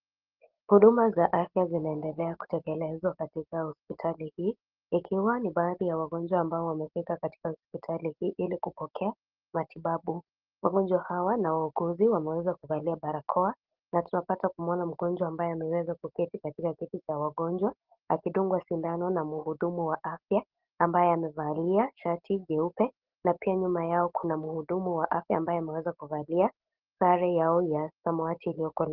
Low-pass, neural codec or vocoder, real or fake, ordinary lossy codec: 5.4 kHz; none; real; Opus, 16 kbps